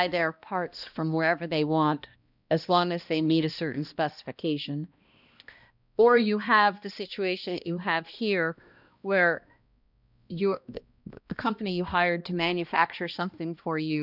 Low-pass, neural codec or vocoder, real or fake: 5.4 kHz; codec, 16 kHz, 1 kbps, X-Codec, HuBERT features, trained on balanced general audio; fake